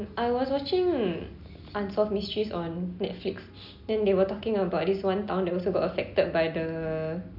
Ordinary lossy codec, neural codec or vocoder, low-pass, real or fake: none; none; 5.4 kHz; real